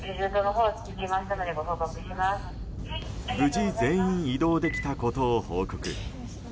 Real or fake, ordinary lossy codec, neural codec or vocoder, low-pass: real; none; none; none